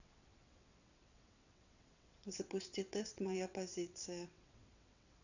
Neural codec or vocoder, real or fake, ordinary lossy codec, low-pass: vocoder, 22.05 kHz, 80 mel bands, WaveNeXt; fake; none; 7.2 kHz